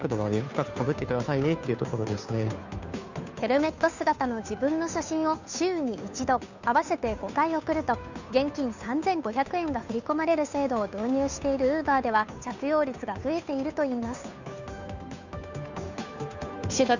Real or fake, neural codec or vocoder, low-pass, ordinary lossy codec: fake; codec, 16 kHz, 2 kbps, FunCodec, trained on Chinese and English, 25 frames a second; 7.2 kHz; none